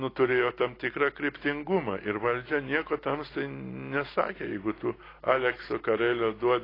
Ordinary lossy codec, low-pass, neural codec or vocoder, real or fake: AAC, 24 kbps; 5.4 kHz; none; real